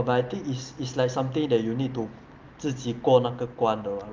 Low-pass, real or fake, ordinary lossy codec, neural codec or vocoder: 7.2 kHz; real; Opus, 32 kbps; none